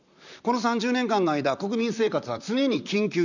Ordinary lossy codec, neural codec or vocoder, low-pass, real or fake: none; vocoder, 44.1 kHz, 128 mel bands, Pupu-Vocoder; 7.2 kHz; fake